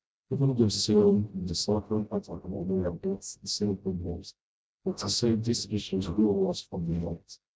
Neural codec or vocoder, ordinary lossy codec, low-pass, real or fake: codec, 16 kHz, 0.5 kbps, FreqCodec, smaller model; none; none; fake